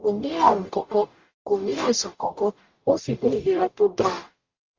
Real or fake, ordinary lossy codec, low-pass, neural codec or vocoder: fake; Opus, 32 kbps; 7.2 kHz; codec, 44.1 kHz, 0.9 kbps, DAC